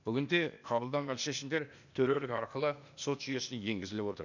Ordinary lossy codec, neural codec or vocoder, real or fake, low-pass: none; codec, 16 kHz, 0.8 kbps, ZipCodec; fake; 7.2 kHz